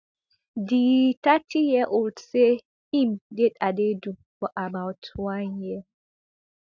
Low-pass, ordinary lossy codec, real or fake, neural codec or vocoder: none; none; real; none